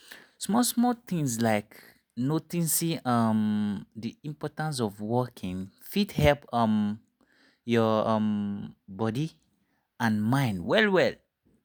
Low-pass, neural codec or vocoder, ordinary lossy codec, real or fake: none; none; none; real